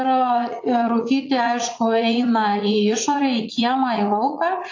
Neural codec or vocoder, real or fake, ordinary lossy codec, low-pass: vocoder, 44.1 kHz, 80 mel bands, Vocos; fake; AAC, 48 kbps; 7.2 kHz